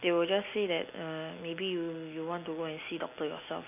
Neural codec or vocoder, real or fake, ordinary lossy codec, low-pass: none; real; none; 3.6 kHz